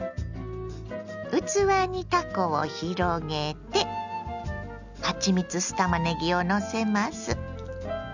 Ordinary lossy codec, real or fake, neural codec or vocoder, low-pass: none; real; none; 7.2 kHz